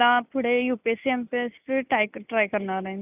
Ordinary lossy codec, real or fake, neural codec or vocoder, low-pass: none; real; none; 3.6 kHz